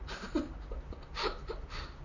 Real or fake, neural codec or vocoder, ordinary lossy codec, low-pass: fake; vocoder, 44.1 kHz, 128 mel bands, Pupu-Vocoder; none; 7.2 kHz